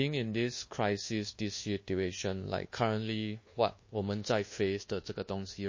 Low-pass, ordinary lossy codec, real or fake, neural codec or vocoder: 7.2 kHz; MP3, 32 kbps; fake; codec, 24 kHz, 1.2 kbps, DualCodec